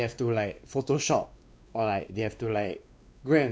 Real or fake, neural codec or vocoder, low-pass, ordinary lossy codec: fake; codec, 16 kHz, 2 kbps, X-Codec, WavLM features, trained on Multilingual LibriSpeech; none; none